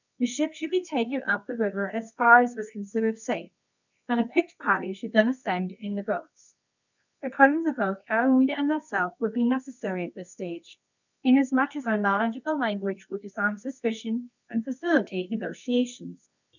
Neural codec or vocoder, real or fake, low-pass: codec, 24 kHz, 0.9 kbps, WavTokenizer, medium music audio release; fake; 7.2 kHz